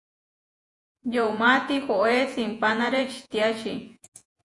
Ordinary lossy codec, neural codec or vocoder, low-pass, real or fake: AAC, 64 kbps; vocoder, 48 kHz, 128 mel bands, Vocos; 10.8 kHz; fake